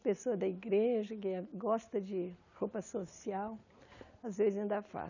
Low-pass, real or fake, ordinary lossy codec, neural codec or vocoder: 7.2 kHz; real; none; none